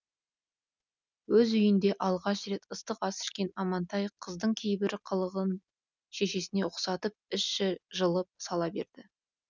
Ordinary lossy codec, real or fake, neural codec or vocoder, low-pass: none; real; none; 7.2 kHz